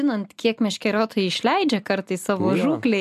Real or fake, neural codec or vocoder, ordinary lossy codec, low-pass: real; none; AAC, 96 kbps; 14.4 kHz